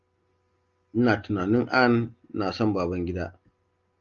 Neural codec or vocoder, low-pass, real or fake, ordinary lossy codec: none; 7.2 kHz; real; Opus, 32 kbps